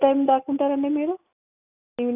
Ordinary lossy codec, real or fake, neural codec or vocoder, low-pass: none; real; none; 3.6 kHz